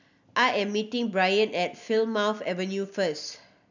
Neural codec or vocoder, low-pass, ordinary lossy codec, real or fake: none; 7.2 kHz; none; real